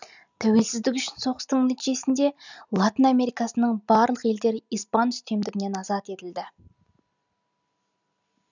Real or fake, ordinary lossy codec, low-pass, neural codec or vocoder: real; none; 7.2 kHz; none